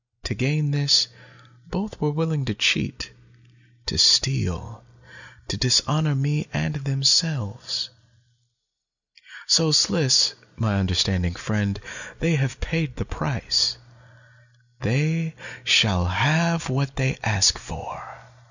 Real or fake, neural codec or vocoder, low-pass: real; none; 7.2 kHz